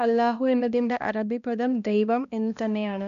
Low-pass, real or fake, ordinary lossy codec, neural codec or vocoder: 7.2 kHz; fake; none; codec, 16 kHz, 1 kbps, X-Codec, HuBERT features, trained on balanced general audio